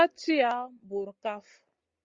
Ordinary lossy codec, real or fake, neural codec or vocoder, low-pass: Opus, 32 kbps; real; none; 7.2 kHz